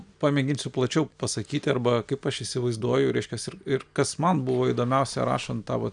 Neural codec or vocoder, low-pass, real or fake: none; 9.9 kHz; real